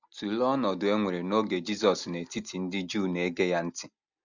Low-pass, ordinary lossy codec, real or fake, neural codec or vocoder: 7.2 kHz; none; real; none